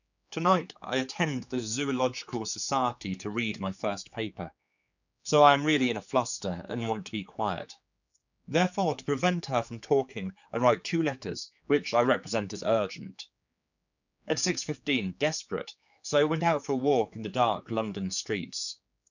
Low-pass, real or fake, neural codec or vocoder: 7.2 kHz; fake; codec, 16 kHz, 4 kbps, X-Codec, HuBERT features, trained on general audio